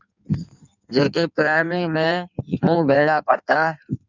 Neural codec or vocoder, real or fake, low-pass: codec, 16 kHz in and 24 kHz out, 1.1 kbps, FireRedTTS-2 codec; fake; 7.2 kHz